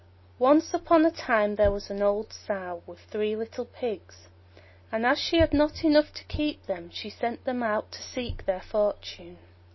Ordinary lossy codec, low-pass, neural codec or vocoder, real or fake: MP3, 24 kbps; 7.2 kHz; none; real